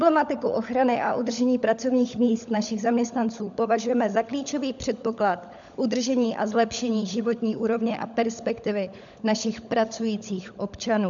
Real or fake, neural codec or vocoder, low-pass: fake; codec, 16 kHz, 16 kbps, FunCodec, trained on LibriTTS, 50 frames a second; 7.2 kHz